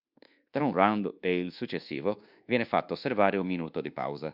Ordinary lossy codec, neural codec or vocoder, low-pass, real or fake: Opus, 64 kbps; codec, 24 kHz, 1.2 kbps, DualCodec; 5.4 kHz; fake